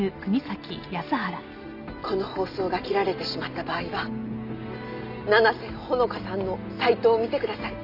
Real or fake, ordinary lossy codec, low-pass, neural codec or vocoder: real; none; 5.4 kHz; none